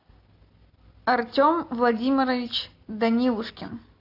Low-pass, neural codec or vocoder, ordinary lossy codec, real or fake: 5.4 kHz; none; AAC, 32 kbps; real